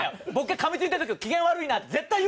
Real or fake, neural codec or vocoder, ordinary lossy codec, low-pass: real; none; none; none